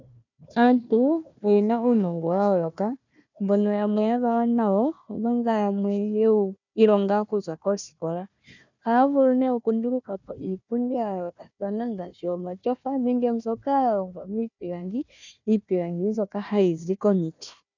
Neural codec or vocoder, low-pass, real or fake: codec, 16 kHz, 1 kbps, FunCodec, trained on Chinese and English, 50 frames a second; 7.2 kHz; fake